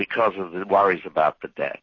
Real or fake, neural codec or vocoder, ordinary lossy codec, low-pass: real; none; MP3, 32 kbps; 7.2 kHz